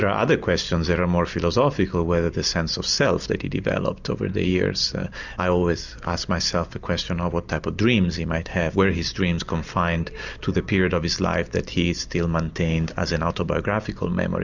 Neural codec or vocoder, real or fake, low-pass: none; real; 7.2 kHz